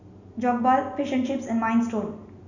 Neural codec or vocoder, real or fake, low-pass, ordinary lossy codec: none; real; 7.2 kHz; none